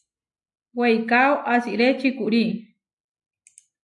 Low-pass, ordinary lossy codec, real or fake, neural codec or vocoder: 10.8 kHz; AAC, 64 kbps; real; none